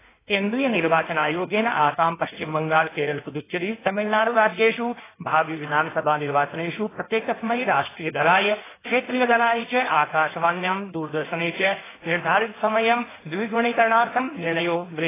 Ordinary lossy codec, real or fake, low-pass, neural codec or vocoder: AAC, 16 kbps; fake; 3.6 kHz; codec, 16 kHz in and 24 kHz out, 1.1 kbps, FireRedTTS-2 codec